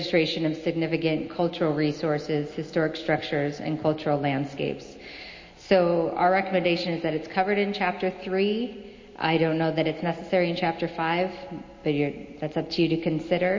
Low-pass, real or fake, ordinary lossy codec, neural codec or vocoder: 7.2 kHz; real; MP3, 32 kbps; none